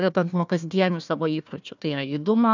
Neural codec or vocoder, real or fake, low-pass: codec, 16 kHz, 1 kbps, FunCodec, trained on Chinese and English, 50 frames a second; fake; 7.2 kHz